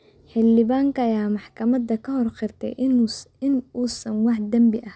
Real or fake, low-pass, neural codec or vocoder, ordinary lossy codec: real; none; none; none